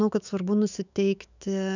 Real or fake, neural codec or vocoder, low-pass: fake; autoencoder, 48 kHz, 128 numbers a frame, DAC-VAE, trained on Japanese speech; 7.2 kHz